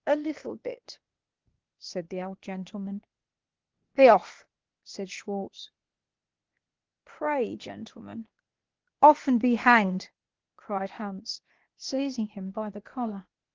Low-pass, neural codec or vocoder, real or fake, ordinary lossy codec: 7.2 kHz; codec, 16 kHz, 0.8 kbps, ZipCodec; fake; Opus, 16 kbps